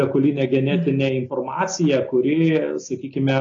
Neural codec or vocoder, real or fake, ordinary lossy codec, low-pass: none; real; AAC, 32 kbps; 7.2 kHz